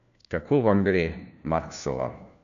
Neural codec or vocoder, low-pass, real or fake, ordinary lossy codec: codec, 16 kHz, 1 kbps, FunCodec, trained on LibriTTS, 50 frames a second; 7.2 kHz; fake; none